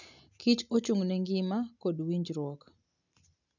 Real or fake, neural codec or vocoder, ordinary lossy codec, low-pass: real; none; none; 7.2 kHz